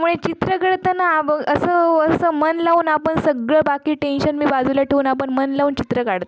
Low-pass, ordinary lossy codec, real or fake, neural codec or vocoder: none; none; real; none